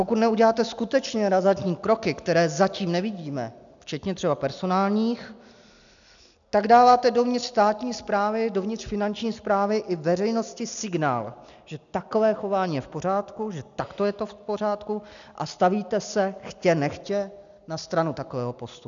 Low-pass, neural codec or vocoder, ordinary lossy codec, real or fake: 7.2 kHz; none; MP3, 96 kbps; real